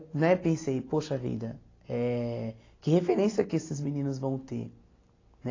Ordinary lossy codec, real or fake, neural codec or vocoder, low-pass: AAC, 32 kbps; real; none; 7.2 kHz